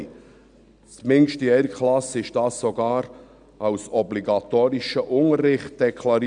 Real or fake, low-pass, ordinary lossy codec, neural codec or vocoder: real; 9.9 kHz; none; none